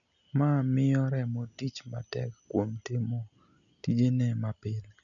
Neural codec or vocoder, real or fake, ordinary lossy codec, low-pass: none; real; none; 7.2 kHz